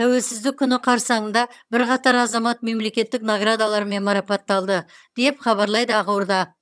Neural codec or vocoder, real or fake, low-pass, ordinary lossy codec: vocoder, 22.05 kHz, 80 mel bands, HiFi-GAN; fake; none; none